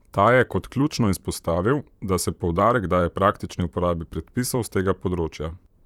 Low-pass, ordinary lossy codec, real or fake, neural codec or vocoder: 19.8 kHz; none; fake; vocoder, 44.1 kHz, 128 mel bands, Pupu-Vocoder